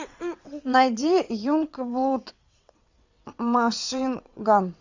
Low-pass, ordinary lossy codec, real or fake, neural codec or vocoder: 7.2 kHz; Opus, 64 kbps; fake; vocoder, 22.05 kHz, 80 mel bands, WaveNeXt